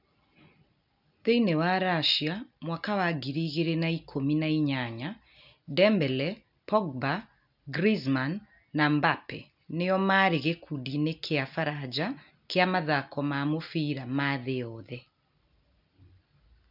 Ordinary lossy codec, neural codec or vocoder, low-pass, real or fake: none; none; 5.4 kHz; real